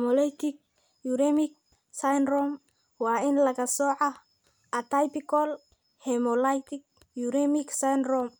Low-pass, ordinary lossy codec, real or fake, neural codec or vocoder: none; none; real; none